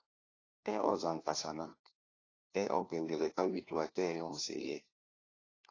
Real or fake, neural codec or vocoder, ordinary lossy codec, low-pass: fake; codec, 16 kHz, 1 kbps, FunCodec, trained on LibriTTS, 50 frames a second; AAC, 32 kbps; 7.2 kHz